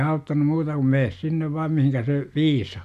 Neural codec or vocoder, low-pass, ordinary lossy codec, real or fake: none; 14.4 kHz; none; real